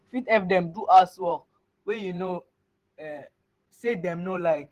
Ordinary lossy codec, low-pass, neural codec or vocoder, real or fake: Opus, 16 kbps; 14.4 kHz; vocoder, 44.1 kHz, 128 mel bands every 512 samples, BigVGAN v2; fake